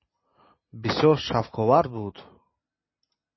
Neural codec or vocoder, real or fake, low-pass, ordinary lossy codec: none; real; 7.2 kHz; MP3, 24 kbps